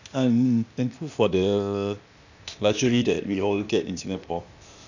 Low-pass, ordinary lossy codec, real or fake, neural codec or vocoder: 7.2 kHz; none; fake; codec, 16 kHz, 0.8 kbps, ZipCodec